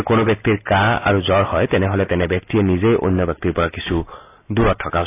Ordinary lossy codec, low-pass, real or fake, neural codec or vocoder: AAC, 24 kbps; 3.6 kHz; real; none